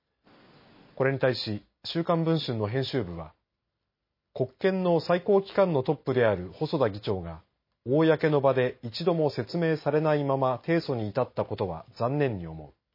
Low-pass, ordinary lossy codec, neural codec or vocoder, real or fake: 5.4 kHz; MP3, 24 kbps; none; real